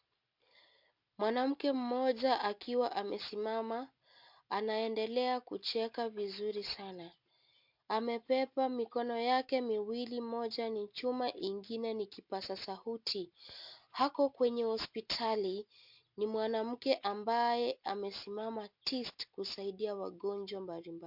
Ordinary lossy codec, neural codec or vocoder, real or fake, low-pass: MP3, 48 kbps; none; real; 5.4 kHz